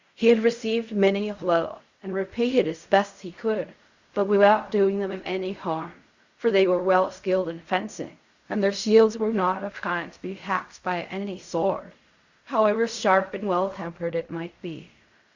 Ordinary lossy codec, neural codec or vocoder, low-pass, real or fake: Opus, 64 kbps; codec, 16 kHz in and 24 kHz out, 0.4 kbps, LongCat-Audio-Codec, fine tuned four codebook decoder; 7.2 kHz; fake